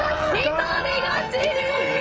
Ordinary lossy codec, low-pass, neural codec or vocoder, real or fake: none; none; codec, 16 kHz, 16 kbps, FreqCodec, smaller model; fake